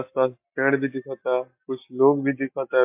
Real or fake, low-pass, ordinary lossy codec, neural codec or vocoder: real; 3.6 kHz; MP3, 32 kbps; none